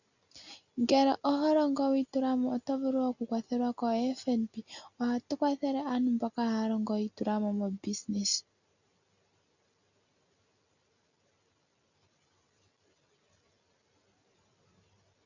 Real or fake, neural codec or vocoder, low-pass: real; none; 7.2 kHz